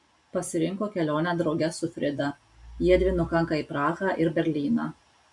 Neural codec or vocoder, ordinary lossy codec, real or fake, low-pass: none; AAC, 64 kbps; real; 10.8 kHz